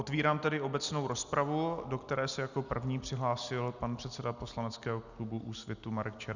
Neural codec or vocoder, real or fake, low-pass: none; real; 7.2 kHz